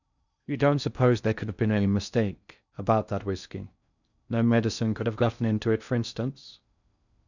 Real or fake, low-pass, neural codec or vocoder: fake; 7.2 kHz; codec, 16 kHz in and 24 kHz out, 0.6 kbps, FocalCodec, streaming, 4096 codes